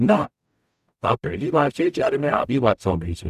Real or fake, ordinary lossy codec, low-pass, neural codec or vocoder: fake; none; 14.4 kHz; codec, 44.1 kHz, 0.9 kbps, DAC